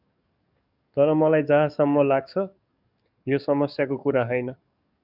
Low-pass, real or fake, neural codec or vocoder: 5.4 kHz; fake; codec, 44.1 kHz, 7.8 kbps, DAC